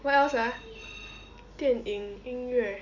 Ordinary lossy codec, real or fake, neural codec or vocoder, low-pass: none; real; none; 7.2 kHz